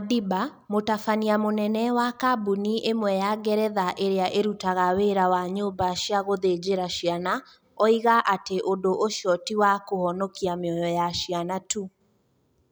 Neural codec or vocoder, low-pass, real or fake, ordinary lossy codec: none; none; real; none